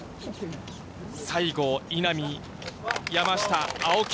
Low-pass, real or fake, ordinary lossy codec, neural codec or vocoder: none; real; none; none